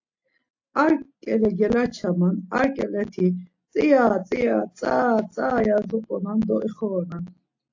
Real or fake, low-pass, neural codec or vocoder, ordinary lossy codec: real; 7.2 kHz; none; AAC, 48 kbps